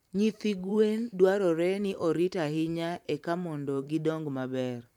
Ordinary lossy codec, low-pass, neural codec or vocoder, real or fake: none; 19.8 kHz; vocoder, 44.1 kHz, 128 mel bands every 512 samples, BigVGAN v2; fake